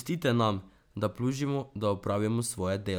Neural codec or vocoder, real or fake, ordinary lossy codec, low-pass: none; real; none; none